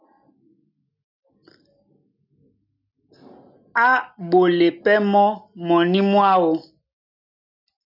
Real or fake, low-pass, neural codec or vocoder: real; 5.4 kHz; none